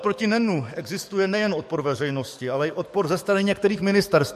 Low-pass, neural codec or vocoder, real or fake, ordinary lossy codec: 14.4 kHz; codec, 44.1 kHz, 7.8 kbps, DAC; fake; MP3, 64 kbps